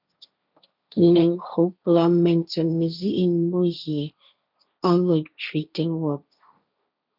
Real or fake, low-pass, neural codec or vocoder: fake; 5.4 kHz; codec, 16 kHz, 1.1 kbps, Voila-Tokenizer